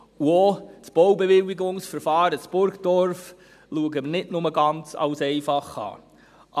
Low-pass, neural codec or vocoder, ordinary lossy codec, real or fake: 14.4 kHz; none; none; real